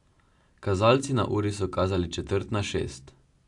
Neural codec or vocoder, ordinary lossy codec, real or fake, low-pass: none; AAC, 64 kbps; real; 10.8 kHz